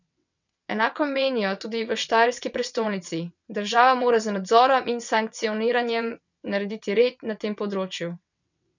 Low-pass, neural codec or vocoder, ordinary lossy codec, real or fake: 7.2 kHz; vocoder, 22.05 kHz, 80 mel bands, WaveNeXt; none; fake